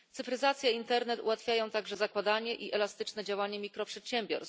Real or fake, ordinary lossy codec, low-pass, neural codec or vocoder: real; none; none; none